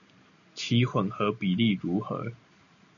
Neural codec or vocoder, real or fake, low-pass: none; real; 7.2 kHz